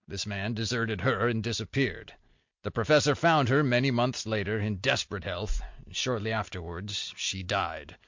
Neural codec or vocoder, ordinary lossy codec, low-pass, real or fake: none; MP3, 48 kbps; 7.2 kHz; real